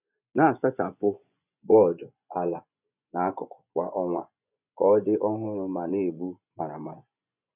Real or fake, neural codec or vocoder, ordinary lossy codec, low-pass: fake; vocoder, 24 kHz, 100 mel bands, Vocos; none; 3.6 kHz